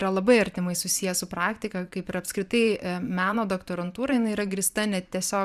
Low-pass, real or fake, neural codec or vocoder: 14.4 kHz; fake; vocoder, 44.1 kHz, 128 mel bands every 512 samples, BigVGAN v2